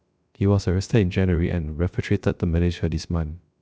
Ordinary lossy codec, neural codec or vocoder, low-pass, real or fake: none; codec, 16 kHz, 0.3 kbps, FocalCodec; none; fake